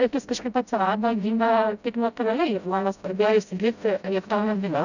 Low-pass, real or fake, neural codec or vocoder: 7.2 kHz; fake; codec, 16 kHz, 0.5 kbps, FreqCodec, smaller model